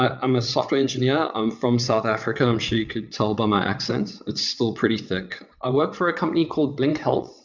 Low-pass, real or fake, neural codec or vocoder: 7.2 kHz; fake; vocoder, 22.05 kHz, 80 mel bands, WaveNeXt